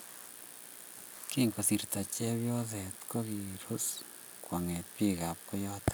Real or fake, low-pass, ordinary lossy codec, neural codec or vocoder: real; none; none; none